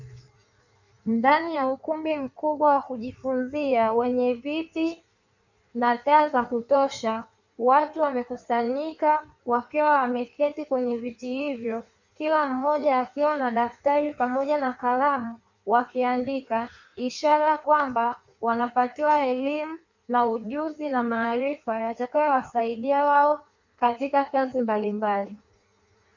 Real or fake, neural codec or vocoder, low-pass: fake; codec, 16 kHz in and 24 kHz out, 1.1 kbps, FireRedTTS-2 codec; 7.2 kHz